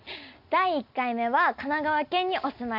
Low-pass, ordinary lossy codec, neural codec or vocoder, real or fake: 5.4 kHz; none; none; real